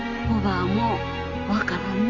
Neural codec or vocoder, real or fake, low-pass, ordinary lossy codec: none; real; 7.2 kHz; none